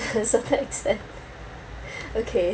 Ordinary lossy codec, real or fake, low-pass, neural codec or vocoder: none; real; none; none